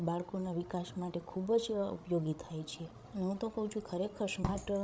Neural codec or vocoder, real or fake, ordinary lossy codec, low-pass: codec, 16 kHz, 16 kbps, FreqCodec, larger model; fake; none; none